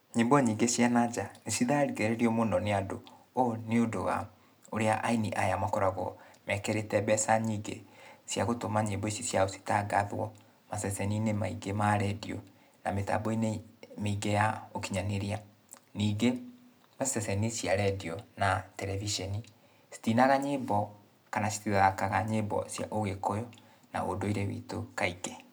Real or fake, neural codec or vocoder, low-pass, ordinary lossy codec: real; none; none; none